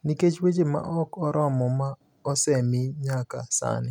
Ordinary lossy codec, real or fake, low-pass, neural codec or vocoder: none; real; 19.8 kHz; none